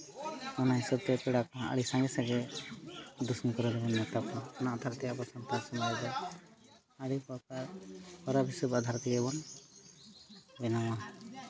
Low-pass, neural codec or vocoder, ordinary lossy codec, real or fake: none; none; none; real